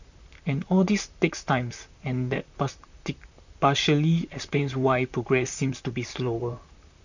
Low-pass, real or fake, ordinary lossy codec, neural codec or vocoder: 7.2 kHz; fake; none; vocoder, 44.1 kHz, 128 mel bands, Pupu-Vocoder